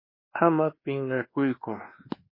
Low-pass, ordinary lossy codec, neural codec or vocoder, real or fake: 5.4 kHz; MP3, 24 kbps; codec, 24 kHz, 1.2 kbps, DualCodec; fake